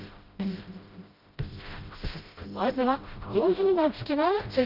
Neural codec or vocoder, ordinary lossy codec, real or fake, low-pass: codec, 16 kHz, 0.5 kbps, FreqCodec, smaller model; Opus, 32 kbps; fake; 5.4 kHz